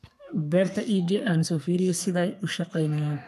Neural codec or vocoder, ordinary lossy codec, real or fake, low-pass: codec, 32 kHz, 1.9 kbps, SNAC; none; fake; 14.4 kHz